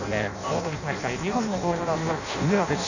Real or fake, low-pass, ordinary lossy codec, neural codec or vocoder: fake; 7.2 kHz; none; codec, 16 kHz in and 24 kHz out, 0.6 kbps, FireRedTTS-2 codec